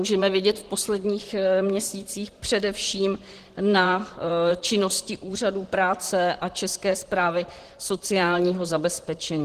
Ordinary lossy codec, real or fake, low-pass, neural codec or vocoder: Opus, 16 kbps; fake; 14.4 kHz; vocoder, 44.1 kHz, 128 mel bands, Pupu-Vocoder